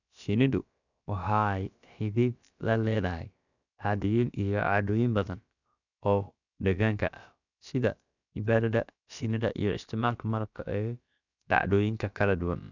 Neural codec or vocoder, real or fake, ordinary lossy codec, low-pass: codec, 16 kHz, about 1 kbps, DyCAST, with the encoder's durations; fake; none; 7.2 kHz